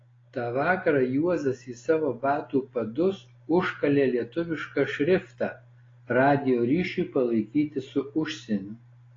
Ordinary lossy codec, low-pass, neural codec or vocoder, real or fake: AAC, 32 kbps; 7.2 kHz; none; real